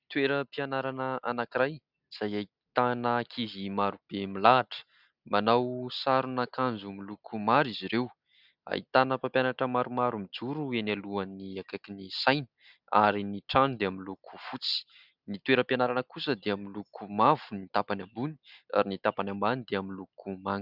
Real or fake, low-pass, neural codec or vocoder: real; 5.4 kHz; none